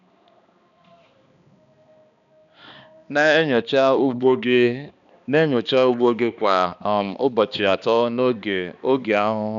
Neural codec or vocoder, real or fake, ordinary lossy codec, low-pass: codec, 16 kHz, 2 kbps, X-Codec, HuBERT features, trained on balanced general audio; fake; none; 7.2 kHz